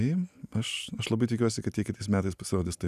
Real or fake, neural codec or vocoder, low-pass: real; none; 14.4 kHz